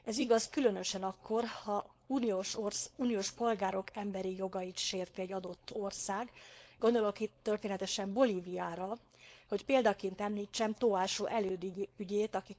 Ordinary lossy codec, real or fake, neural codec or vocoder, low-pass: none; fake; codec, 16 kHz, 4.8 kbps, FACodec; none